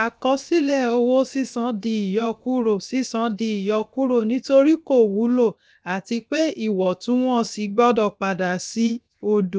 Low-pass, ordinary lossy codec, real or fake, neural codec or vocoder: none; none; fake; codec, 16 kHz, 0.7 kbps, FocalCodec